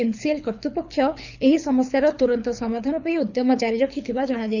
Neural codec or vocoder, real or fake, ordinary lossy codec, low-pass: codec, 24 kHz, 6 kbps, HILCodec; fake; none; 7.2 kHz